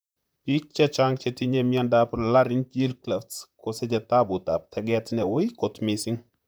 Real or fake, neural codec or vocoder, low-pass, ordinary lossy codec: fake; vocoder, 44.1 kHz, 128 mel bands, Pupu-Vocoder; none; none